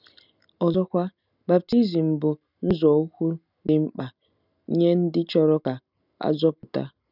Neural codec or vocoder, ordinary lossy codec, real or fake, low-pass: none; none; real; 5.4 kHz